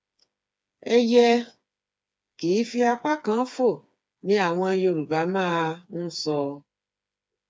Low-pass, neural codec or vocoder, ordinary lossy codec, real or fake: none; codec, 16 kHz, 4 kbps, FreqCodec, smaller model; none; fake